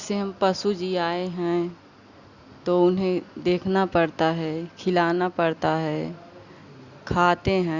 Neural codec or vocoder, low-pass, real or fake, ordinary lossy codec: none; 7.2 kHz; real; Opus, 64 kbps